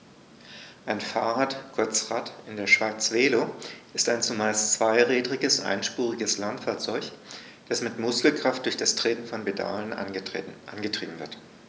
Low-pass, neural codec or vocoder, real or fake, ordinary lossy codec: none; none; real; none